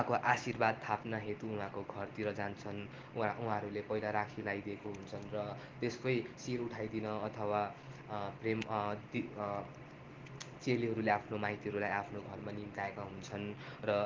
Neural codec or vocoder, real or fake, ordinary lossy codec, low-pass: none; real; Opus, 16 kbps; 7.2 kHz